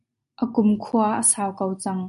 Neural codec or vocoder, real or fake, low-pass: none; real; 10.8 kHz